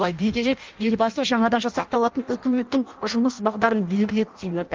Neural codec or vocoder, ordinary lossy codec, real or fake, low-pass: codec, 16 kHz in and 24 kHz out, 0.6 kbps, FireRedTTS-2 codec; Opus, 32 kbps; fake; 7.2 kHz